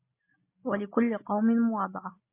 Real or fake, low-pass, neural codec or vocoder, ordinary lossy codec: real; 3.6 kHz; none; MP3, 24 kbps